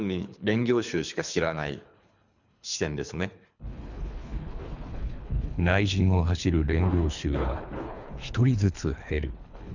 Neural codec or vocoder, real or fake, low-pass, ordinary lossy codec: codec, 24 kHz, 3 kbps, HILCodec; fake; 7.2 kHz; none